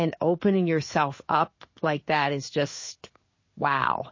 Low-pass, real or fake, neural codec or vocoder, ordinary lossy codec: 7.2 kHz; fake; codec, 16 kHz in and 24 kHz out, 1 kbps, XY-Tokenizer; MP3, 32 kbps